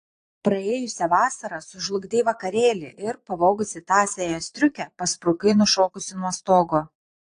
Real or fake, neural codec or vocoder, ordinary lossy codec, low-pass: fake; vocoder, 22.05 kHz, 80 mel bands, Vocos; AAC, 48 kbps; 9.9 kHz